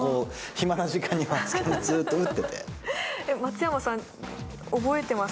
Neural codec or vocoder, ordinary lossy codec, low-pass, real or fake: none; none; none; real